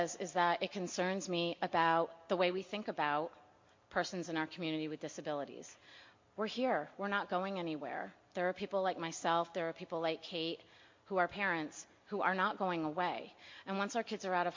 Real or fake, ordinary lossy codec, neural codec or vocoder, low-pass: real; MP3, 48 kbps; none; 7.2 kHz